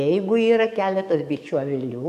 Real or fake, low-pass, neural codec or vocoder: fake; 14.4 kHz; codec, 44.1 kHz, 7.8 kbps, DAC